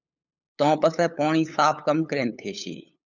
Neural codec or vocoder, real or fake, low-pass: codec, 16 kHz, 8 kbps, FunCodec, trained on LibriTTS, 25 frames a second; fake; 7.2 kHz